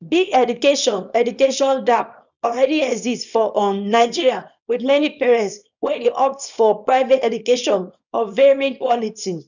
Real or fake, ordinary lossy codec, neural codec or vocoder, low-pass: fake; none; codec, 24 kHz, 0.9 kbps, WavTokenizer, small release; 7.2 kHz